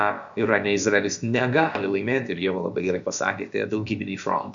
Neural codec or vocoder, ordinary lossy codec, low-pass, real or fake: codec, 16 kHz, about 1 kbps, DyCAST, with the encoder's durations; MP3, 64 kbps; 7.2 kHz; fake